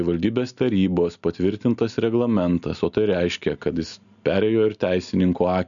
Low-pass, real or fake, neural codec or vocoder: 7.2 kHz; real; none